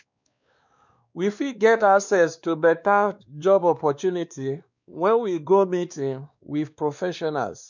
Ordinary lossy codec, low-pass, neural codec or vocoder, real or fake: none; 7.2 kHz; codec, 16 kHz, 2 kbps, X-Codec, WavLM features, trained on Multilingual LibriSpeech; fake